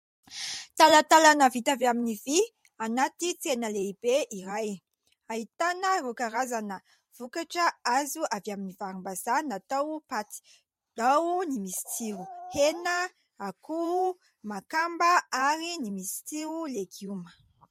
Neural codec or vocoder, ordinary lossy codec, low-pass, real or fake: vocoder, 44.1 kHz, 128 mel bands every 512 samples, BigVGAN v2; MP3, 64 kbps; 19.8 kHz; fake